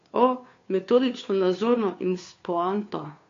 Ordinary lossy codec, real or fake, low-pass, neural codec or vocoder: AAC, 48 kbps; fake; 7.2 kHz; codec, 16 kHz, 2 kbps, FunCodec, trained on Chinese and English, 25 frames a second